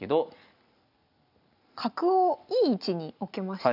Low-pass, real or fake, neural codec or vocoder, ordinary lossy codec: 5.4 kHz; real; none; none